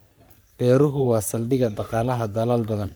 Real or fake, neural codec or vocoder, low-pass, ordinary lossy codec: fake; codec, 44.1 kHz, 3.4 kbps, Pupu-Codec; none; none